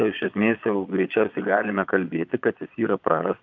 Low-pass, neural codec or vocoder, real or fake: 7.2 kHz; vocoder, 22.05 kHz, 80 mel bands, Vocos; fake